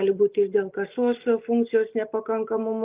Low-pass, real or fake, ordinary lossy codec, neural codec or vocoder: 3.6 kHz; real; Opus, 32 kbps; none